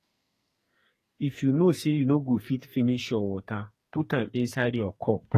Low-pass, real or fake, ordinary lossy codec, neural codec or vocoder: 14.4 kHz; fake; AAC, 48 kbps; codec, 44.1 kHz, 2.6 kbps, SNAC